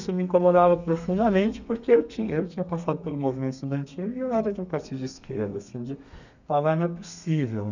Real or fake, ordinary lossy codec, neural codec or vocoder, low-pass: fake; none; codec, 32 kHz, 1.9 kbps, SNAC; 7.2 kHz